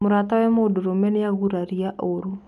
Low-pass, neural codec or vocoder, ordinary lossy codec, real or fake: none; none; none; real